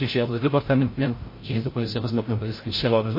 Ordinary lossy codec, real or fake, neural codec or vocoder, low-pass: MP3, 24 kbps; fake; codec, 16 kHz, 0.5 kbps, FreqCodec, larger model; 5.4 kHz